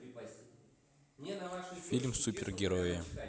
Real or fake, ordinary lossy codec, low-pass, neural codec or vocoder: real; none; none; none